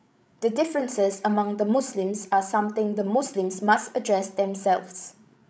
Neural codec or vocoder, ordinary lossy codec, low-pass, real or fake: codec, 16 kHz, 16 kbps, FreqCodec, larger model; none; none; fake